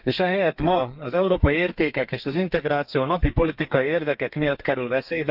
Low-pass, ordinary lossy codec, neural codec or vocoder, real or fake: 5.4 kHz; none; codec, 32 kHz, 1.9 kbps, SNAC; fake